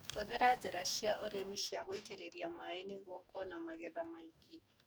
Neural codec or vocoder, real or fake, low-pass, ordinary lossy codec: codec, 44.1 kHz, 2.6 kbps, DAC; fake; none; none